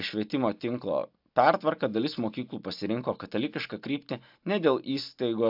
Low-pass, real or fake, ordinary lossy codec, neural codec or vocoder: 5.4 kHz; real; AAC, 48 kbps; none